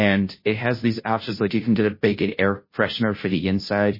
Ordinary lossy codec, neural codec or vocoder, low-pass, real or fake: MP3, 24 kbps; codec, 16 kHz, 0.5 kbps, FunCodec, trained on Chinese and English, 25 frames a second; 5.4 kHz; fake